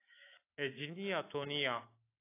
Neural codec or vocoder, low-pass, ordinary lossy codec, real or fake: autoencoder, 48 kHz, 128 numbers a frame, DAC-VAE, trained on Japanese speech; 3.6 kHz; AAC, 24 kbps; fake